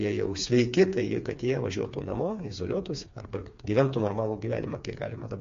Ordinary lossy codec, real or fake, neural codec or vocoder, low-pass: MP3, 48 kbps; fake; codec, 16 kHz, 4 kbps, FreqCodec, smaller model; 7.2 kHz